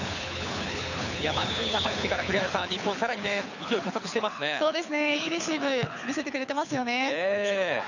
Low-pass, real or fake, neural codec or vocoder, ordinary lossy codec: 7.2 kHz; fake; codec, 24 kHz, 6 kbps, HILCodec; none